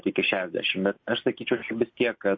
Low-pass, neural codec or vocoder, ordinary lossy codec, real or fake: 7.2 kHz; none; MP3, 32 kbps; real